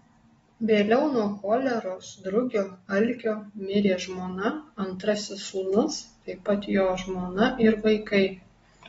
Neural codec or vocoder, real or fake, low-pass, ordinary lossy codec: none; real; 19.8 kHz; AAC, 24 kbps